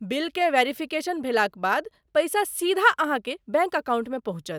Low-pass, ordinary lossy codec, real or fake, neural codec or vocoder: none; none; real; none